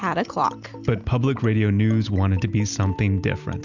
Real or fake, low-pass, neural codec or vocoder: real; 7.2 kHz; none